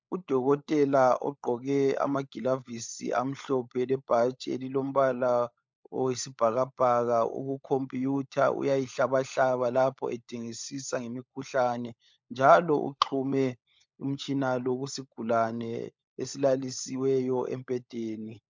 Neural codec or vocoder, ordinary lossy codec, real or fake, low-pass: codec, 16 kHz, 16 kbps, FunCodec, trained on LibriTTS, 50 frames a second; MP3, 64 kbps; fake; 7.2 kHz